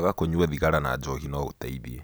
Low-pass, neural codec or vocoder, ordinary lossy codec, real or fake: none; none; none; real